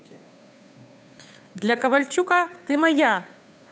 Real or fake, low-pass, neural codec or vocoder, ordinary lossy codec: fake; none; codec, 16 kHz, 2 kbps, FunCodec, trained on Chinese and English, 25 frames a second; none